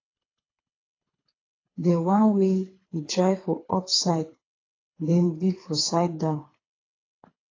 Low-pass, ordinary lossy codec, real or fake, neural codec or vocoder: 7.2 kHz; AAC, 32 kbps; fake; codec, 24 kHz, 3 kbps, HILCodec